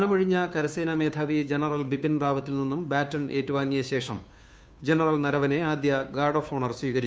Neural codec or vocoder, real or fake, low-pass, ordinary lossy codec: codec, 16 kHz, 2 kbps, FunCodec, trained on Chinese and English, 25 frames a second; fake; none; none